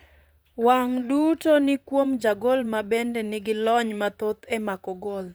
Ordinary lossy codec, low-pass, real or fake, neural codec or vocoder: none; none; fake; vocoder, 44.1 kHz, 128 mel bands, Pupu-Vocoder